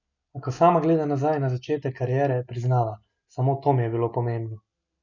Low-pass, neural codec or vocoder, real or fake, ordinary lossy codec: 7.2 kHz; none; real; none